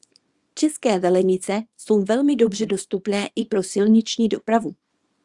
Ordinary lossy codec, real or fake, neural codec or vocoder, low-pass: Opus, 64 kbps; fake; codec, 24 kHz, 0.9 kbps, WavTokenizer, small release; 10.8 kHz